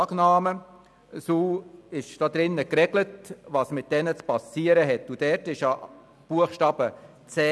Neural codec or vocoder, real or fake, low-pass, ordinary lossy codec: none; real; none; none